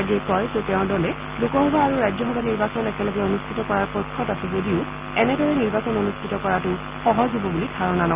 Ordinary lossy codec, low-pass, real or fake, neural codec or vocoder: Opus, 24 kbps; 3.6 kHz; real; none